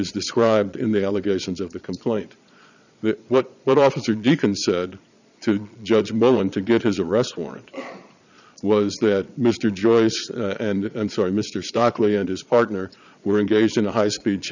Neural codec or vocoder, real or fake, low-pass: vocoder, 22.05 kHz, 80 mel bands, Vocos; fake; 7.2 kHz